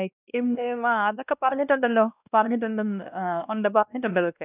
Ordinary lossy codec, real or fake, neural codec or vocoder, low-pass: none; fake; codec, 16 kHz, 1 kbps, X-Codec, WavLM features, trained on Multilingual LibriSpeech; 3.6 kHz